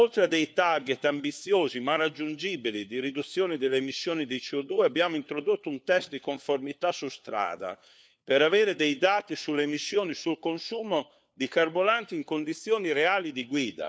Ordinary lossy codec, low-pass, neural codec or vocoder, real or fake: none; none; codec, 16 kHz, 4 kbps, FunCodec, trained on LibriTTS, 50 frames a second; fake